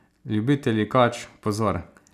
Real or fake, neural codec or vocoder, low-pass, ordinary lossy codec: real; none; 14.4 kHz; none